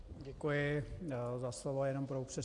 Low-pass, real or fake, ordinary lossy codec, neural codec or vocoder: 10.8 kHz; real; AAC, 48 kbps; none